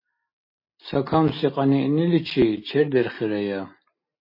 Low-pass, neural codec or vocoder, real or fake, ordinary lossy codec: 5.4 kHz; none; real; MP3, 24 kbps